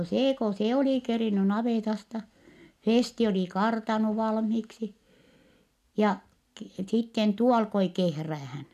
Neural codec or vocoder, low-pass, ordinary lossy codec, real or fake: none; 14.4 kHz; none; real